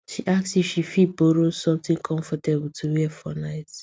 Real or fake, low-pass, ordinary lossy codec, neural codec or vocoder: real; none; none; none